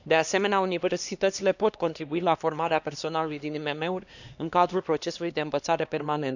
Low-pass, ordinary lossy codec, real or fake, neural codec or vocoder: 7.2 kHz; none; fake; codec, 16 kHz, 2 kbps, X-Codec, HuBERT features, trained on LibriSpeech